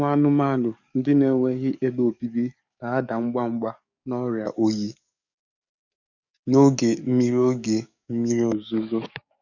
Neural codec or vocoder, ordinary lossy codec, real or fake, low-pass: codec, 44.1 kHz, 7.8 kbps, DAC; none; fake; 7.2 kHz